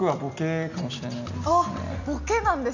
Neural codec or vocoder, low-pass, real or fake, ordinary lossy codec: none; 7.2 kHz; real; none